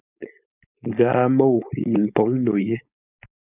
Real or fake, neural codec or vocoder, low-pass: fake; codec, 16 kHz, 4.8 kbps, FACodec; 3.6 kHz